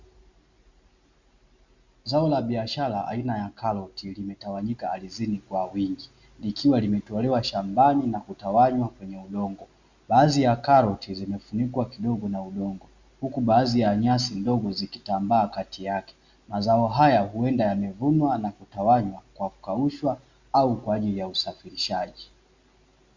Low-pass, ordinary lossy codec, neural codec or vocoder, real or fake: 7.2 kHz; Opus, 64 kbps; none; real